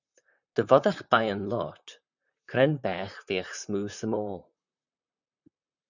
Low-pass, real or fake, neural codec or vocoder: 7.2 kHz; fake; vocoder, 44.1 kHz, 128 mel bands, Pupu-Vocoder